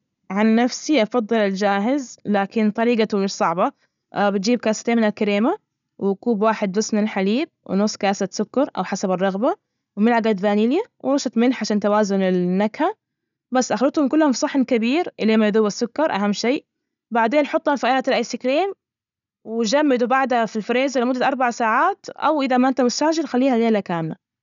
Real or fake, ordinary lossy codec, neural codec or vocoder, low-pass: real; none; none; 7.2 kHz